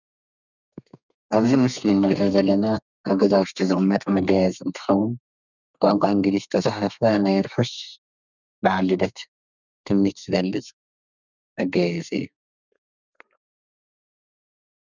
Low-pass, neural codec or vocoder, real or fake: 7.2 kHz; codec, 32 kHz, 1.9 kbps, SNAC; fake